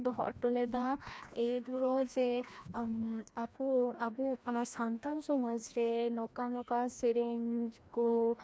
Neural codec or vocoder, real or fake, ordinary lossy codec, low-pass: codec, 16 kHz, 1 kbps, FreqCodec, larger model; fake; none; none